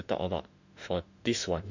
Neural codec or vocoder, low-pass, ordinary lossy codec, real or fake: autoencoder, 48 kHz, 32 numbers a frame, DAC-VAE, trained on Japanese speech; 7.2 kHz; none; fake